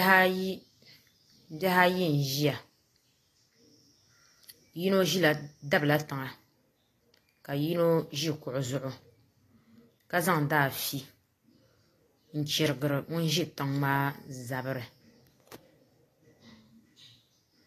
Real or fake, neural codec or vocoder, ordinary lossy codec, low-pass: real; none; AAC, 48 kbps; 14.4 kHz